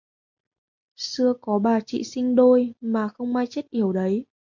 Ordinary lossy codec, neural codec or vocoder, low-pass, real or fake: MP3, 48 kbps; none; 7.2 kHz; real